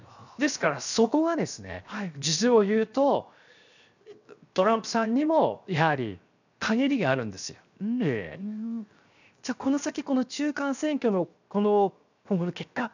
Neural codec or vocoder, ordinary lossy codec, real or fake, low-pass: codec, 16 kHz, 0.7 kbps, FocalCodec; none; fake; 7.2 kHz